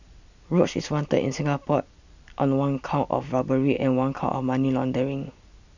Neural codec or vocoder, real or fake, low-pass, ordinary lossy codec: none; real; 7.2 kHz; none